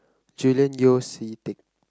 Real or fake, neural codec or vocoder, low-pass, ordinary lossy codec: real; none; none; none